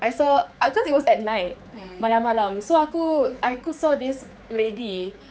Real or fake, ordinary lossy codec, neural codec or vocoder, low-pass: fake; none; codec, 16 kHz, 4 kbps, X-Codec, HuBERT features, trained on general audio; none